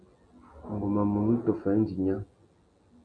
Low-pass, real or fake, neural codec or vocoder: 9.9 kHz; real; none